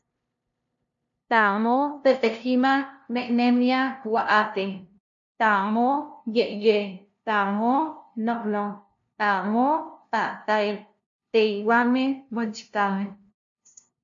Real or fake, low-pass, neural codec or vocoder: fake; 7.2 kHz; codec, 16 kHz, 0.5 kbps, FunCodec, trained on LibriTTS, 25 frames a second